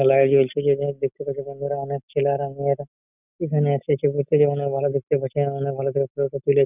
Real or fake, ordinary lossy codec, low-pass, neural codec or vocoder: real; none; 3.6 kHz; none